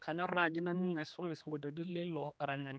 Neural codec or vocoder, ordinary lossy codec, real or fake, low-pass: codec, 16 kHz, 2 kbps, X-Codec, HuBERT features, trained on general audio; none; fake; none